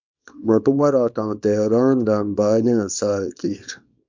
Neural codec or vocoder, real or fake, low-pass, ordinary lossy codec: codec, 24 kHz, 0.9 kbps, WavTokenizer, small release; fake; 7.2 kHz; MP3, 64 kbps